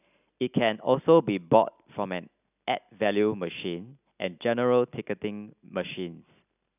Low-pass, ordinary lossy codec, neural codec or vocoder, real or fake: 3.6 kHz; none; none; real